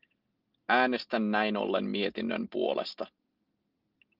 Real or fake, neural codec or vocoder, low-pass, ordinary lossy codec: real; none; 5.4 kHz; Opus, 24 kbps